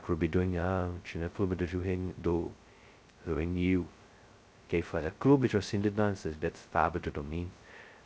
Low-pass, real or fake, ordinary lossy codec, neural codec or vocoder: none; fake; none; codec, 16 kHz, 0.2 kbps, FocalCodec